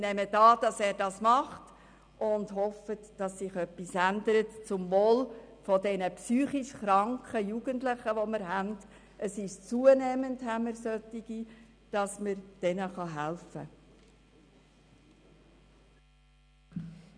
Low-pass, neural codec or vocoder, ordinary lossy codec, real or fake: 9.9 kHz; none; none; real